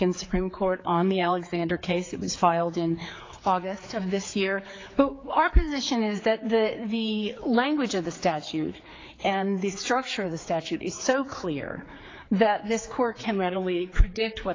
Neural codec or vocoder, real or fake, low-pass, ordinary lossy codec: codec, 16 kHz, 4 kbps, X-Codec, HuBERT features, trained on general audio; fake; 7.2 kHz; AAC, 32 kbps